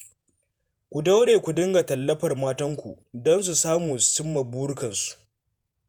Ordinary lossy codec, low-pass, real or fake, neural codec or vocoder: none; none; fake; vocoder, 48 kHz, 128 mel bands, Vocos